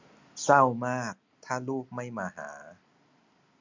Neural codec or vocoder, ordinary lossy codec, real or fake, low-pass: none; MP3, 64 kbps; real; 7.2 kHz